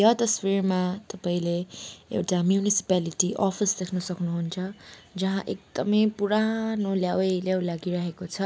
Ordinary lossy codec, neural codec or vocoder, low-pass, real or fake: none; none; none; real